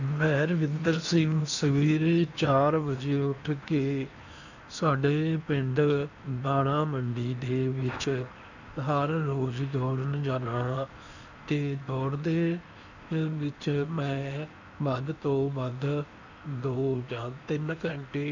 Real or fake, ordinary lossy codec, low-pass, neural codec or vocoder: fake; none; 7.2 kHz; codec, 16 kHz in and 24 kHz out, 0.8 kbps, FocalCodec, streaming, 65536 codes